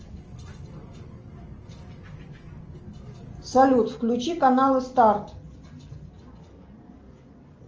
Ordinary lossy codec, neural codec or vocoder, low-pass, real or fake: Opus, 24 kbps; none; 7.2 kHz; real